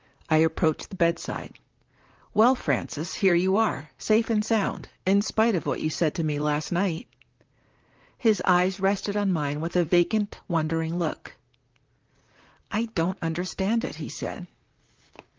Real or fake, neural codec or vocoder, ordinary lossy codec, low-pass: fake; vocoder, 44.1 kHz, 128 mel bands, Pupu-Vocoder; Opus, 32 kbps; 7.2 kHz